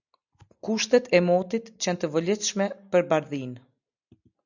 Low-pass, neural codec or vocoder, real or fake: 7.2 kHz; none; real